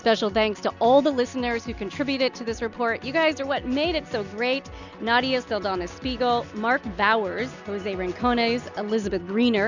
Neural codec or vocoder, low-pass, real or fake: none; 7.2 kHz; real